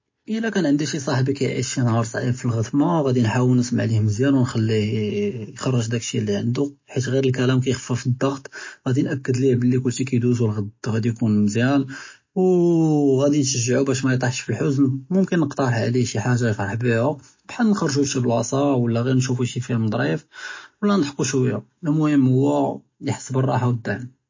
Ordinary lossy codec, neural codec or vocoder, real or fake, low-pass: MP3, 32 kbps; none; real; 7.2 kHz